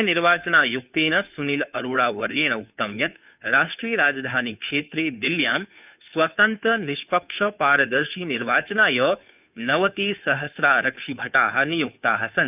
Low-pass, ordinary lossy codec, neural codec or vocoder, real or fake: 3.6 kHz; none; codec, 16 kHz, 2 kbps, FunCodec, trained on Chinese and English, 25 frames a second; fake